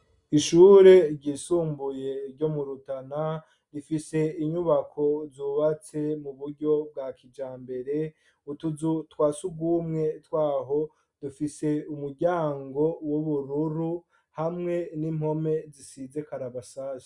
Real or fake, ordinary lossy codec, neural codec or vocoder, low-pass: real; Opus, 64 kbps; none; 10.8 kHz